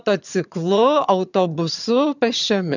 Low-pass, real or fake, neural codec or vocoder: 7.2 kHz; fake; vocoder, 22.05 kHz, 80 mel bands, HiFi-GAN